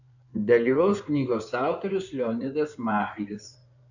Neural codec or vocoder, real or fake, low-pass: codec, 16 kHz in and 24 kHz out, 2.2 kbps, FireRedTTS-2 codec; fake; 7.2 kHz